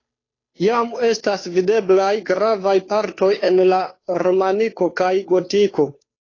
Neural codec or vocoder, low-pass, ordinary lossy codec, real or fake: codec, 16 kHz, 2 kbps, FunCodec, trained on Chinese and English, 25 frames a second; 7.2 kHz; AAC, 32 kbps; fake